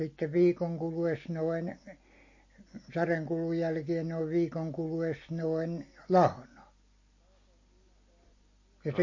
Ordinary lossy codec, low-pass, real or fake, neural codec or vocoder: MP3, 32 kbps; 7.2 kHz; real; none